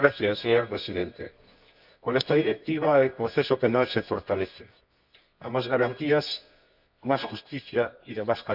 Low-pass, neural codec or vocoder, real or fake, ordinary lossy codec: 5.4 kHz; codec, 24 kHz, 0.9 kbps, WavTokenizer, medium music audio release; fake; none